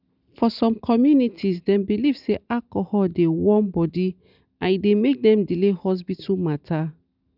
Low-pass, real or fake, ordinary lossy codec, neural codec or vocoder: 5.4 kHz; real; none; none